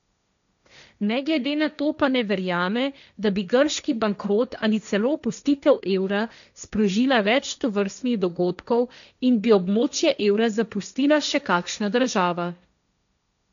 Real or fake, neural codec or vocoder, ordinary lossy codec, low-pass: fake; codec, 16 kHz, 1.1 kbps, Voila-Tokenizer; none; 7.2 kHz